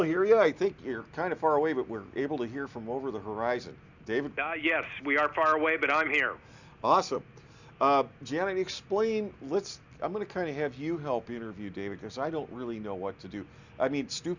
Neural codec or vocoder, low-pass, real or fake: none; 7.2 kHz; real